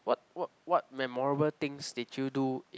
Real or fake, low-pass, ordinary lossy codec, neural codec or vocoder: real; none; none; none